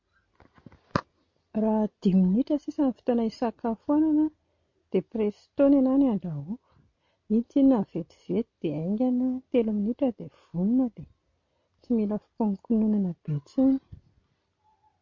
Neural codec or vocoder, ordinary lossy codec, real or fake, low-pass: none; MP3, 48 kbps; real; 7.2 kHz